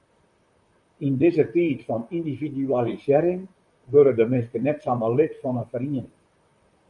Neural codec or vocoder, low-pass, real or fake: vocoder, 44.1 kHz, 128 mel bands, Pupu-Vocoder; 10.8 kHz; fake